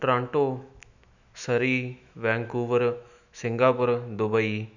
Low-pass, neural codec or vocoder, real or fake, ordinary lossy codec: 7.2 kHz; autoencoder, 48 kHz, 128 numbers a frame, DAC-VAE, trained on Japanese speech; fake; none